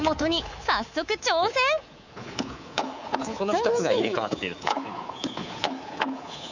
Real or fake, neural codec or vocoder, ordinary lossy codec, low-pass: fake; codec, 24 kHz, 3.1 kbps, DualCodec; none; 7.2 kHz